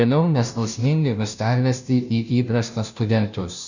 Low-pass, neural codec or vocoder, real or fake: 7.2 kHz; codec, 16 kHz, 0.5 kbps, FunCodec, trained on Chinese and English, 25 frames a second; fake